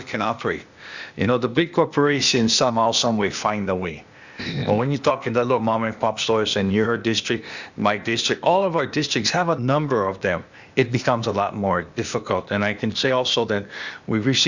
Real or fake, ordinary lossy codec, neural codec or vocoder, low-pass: fake; Opus, 64 kbps; codec, 16 kHz, 0.8 kbps, ZipCodec; 7.2 kHz